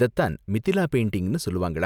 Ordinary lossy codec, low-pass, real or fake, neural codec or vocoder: none; 19.8 kHz; real; none